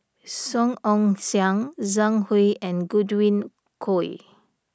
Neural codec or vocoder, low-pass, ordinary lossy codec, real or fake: none; none; none; real